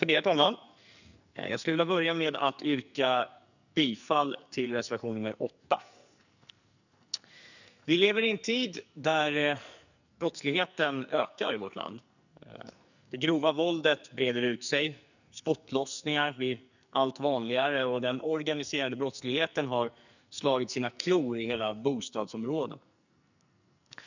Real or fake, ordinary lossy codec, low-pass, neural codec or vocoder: fake; none; 7.2 kHz; codec, 44.1 kHz, 2.6 kbps, SNAC